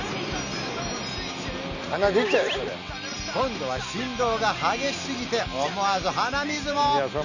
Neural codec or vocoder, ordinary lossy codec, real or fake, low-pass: none; none; real; 7.2 kHz